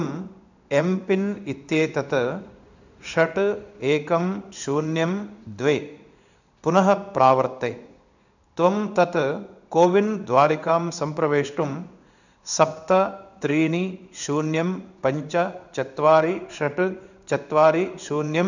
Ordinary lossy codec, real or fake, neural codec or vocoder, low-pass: none; fake; codec, 16 kHz in and 24 kHz out, 1 kbps, XY-Tokenizer; 7.2 kHz